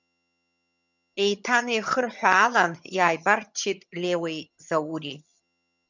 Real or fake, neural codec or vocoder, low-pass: fake; vocoder, 22.05 kHz, 80 mel bands, HiFi-GAN; 7.2 kHz